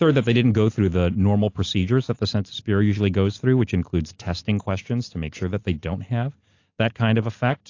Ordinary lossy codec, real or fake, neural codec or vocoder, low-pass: AAC, 48 kbps; real; none; 7.2 kHz